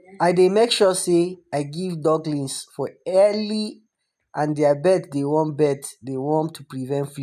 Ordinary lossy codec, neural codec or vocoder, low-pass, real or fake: none; none; 14.4 kHz; real